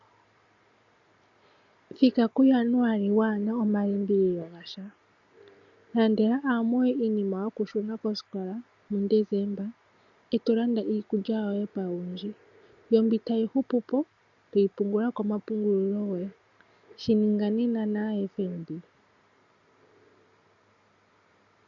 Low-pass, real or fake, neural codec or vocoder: 7.2 kHz; real; none